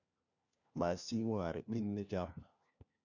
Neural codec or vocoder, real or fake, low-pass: codec, 16 kHz, 1 kbps, FunCodec, trained on LibriTTS, 50 frames a second; fake; 7.2 kHz